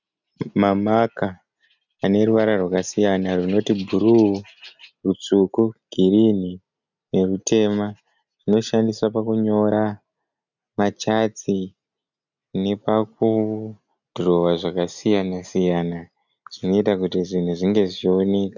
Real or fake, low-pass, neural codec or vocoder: real; 7.2 kHz; none